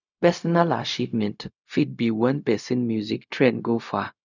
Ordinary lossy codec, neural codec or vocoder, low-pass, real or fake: none; codec, 16 kHz, 0.4 kbps, LongCat-Audio-Codec; 7.2 kHz; fake